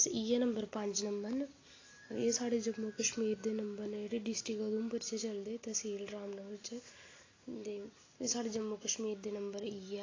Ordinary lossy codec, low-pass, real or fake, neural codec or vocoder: AAC, 32 kbps; 7.2 kHz; real; none